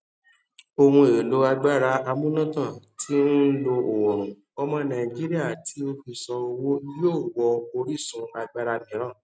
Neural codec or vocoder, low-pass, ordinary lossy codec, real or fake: none; none; none; real